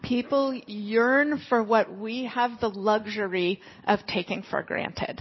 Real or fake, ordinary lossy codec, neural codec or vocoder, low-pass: real; MP3, 24 kbps; none; 7.2 kHz